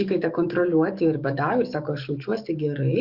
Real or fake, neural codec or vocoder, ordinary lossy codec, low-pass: fake; codec, 44.1 kHz, 7.8 kbps, DAC; Opus, 64 kbps; 5.4 kHz